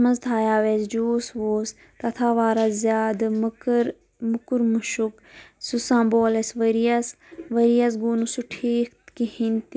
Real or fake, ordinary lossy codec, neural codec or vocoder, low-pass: real; none; none; none